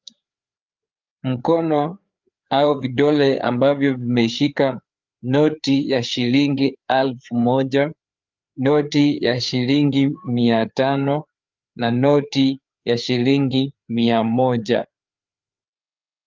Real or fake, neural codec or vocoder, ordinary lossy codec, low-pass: fake; codec, 16 kHz, 4 kbps, FreqCodec, larger model; Opus, 24 kbps; 7.2 kHz